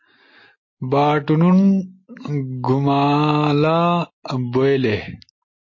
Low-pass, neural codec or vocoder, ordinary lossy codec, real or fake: 7.2 kHz; none; MP3, 32 kbps; real